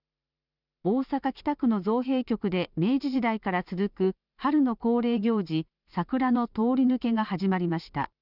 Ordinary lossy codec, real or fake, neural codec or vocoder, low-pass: none; fake; vocoder, 44.1 kHz, 128 mel bands every 512 samples, BigVGAN v2; 5.4 kHz